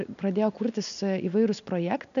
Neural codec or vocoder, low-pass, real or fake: none; 7.2 kHz; real